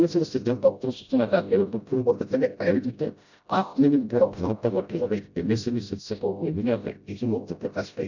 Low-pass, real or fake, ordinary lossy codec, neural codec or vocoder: 7.2 kHz; fake; none; codec, 16 kHz, 0.5 kbps, FreqCodec, smaller model